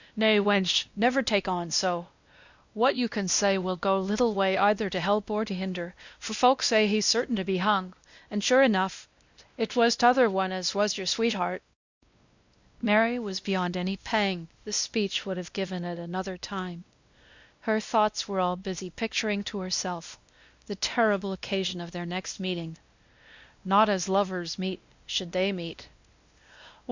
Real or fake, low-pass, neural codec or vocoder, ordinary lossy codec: fake; 7.2 kHz; codec, 16 kHz, 1 kbps, X-Codec, WavLM features, trained on Multilingual LibriSpeech; Opus, 64 kbps